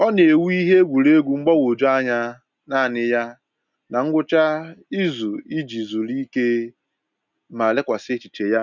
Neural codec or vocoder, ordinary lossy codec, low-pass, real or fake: none; none; 7.2 kHz; real